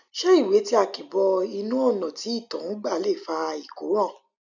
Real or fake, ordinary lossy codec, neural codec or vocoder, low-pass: real; none; none; 7.2 kHz